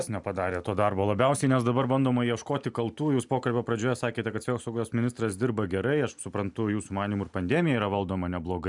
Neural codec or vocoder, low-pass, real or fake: none; 10.8 kHz; real